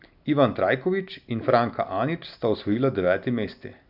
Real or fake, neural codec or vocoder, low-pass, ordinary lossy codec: real; none; 5.4 kHz; none